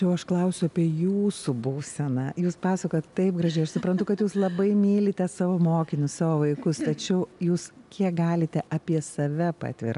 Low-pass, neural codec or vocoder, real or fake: 10.8 kHz; none; real